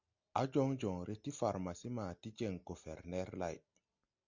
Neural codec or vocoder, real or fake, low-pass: none; real; 7.2 kHz